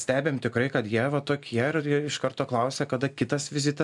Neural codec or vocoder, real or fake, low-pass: none; real; 10.8 kHz